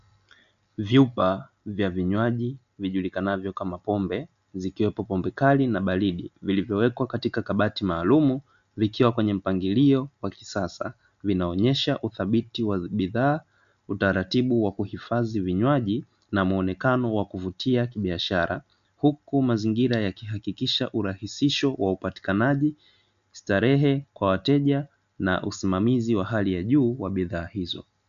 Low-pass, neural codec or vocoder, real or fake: 7.2 kHz; none; real